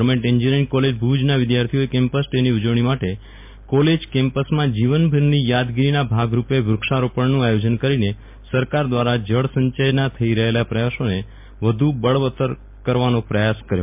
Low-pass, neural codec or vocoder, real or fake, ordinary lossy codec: 3.6 kHz; none; real; MP3, 32 kbps